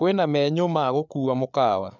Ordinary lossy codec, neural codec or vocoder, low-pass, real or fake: none; codec, 16 kHz, 8 kbps, FreqCodec, larger model; 7.2 kHz; fake